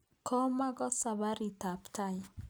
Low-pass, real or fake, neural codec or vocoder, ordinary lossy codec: none; real; none; none